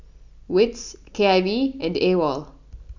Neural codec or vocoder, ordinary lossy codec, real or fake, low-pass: none; none; real; 7.2 kHz